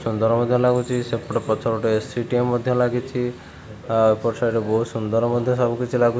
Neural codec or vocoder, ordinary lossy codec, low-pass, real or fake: none; none; none; real